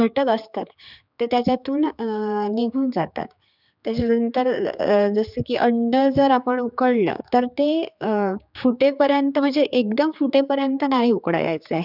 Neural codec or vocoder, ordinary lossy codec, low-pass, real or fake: codec, 16 kHz, 4 kbps, X-Codec, HuBERT features, trained on general audio; none; 5.4 kHz; fake